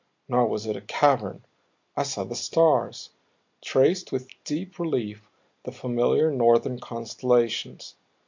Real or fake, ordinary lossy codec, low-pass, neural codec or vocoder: real; MP3, 48 kbps; 7.2 kHz; none